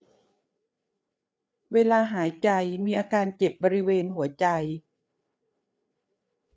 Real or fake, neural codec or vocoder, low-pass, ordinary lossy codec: fake; codec, 16 kHz, 4 kbps, FreqCodec, larger model; none; none